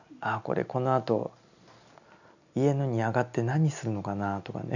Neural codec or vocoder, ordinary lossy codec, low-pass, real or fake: none; none; 7.2 kHz; real